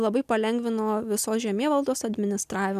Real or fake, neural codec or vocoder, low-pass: real; none; 14.4 kHz